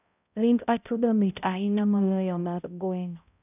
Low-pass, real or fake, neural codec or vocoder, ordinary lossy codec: 3.6 kHz; fake; codec, 16 kHz, 0.5 kbps, X-Codec, HuBERT features, trained on balanced general audio; none